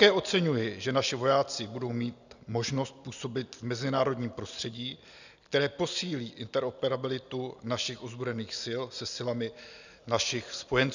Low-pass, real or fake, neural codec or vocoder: 7.2 kHz; real; none